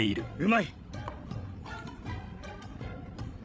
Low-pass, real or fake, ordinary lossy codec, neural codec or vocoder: none; fake; none; codec, 16 kHz, 16 kbps, FreqCodec, larger model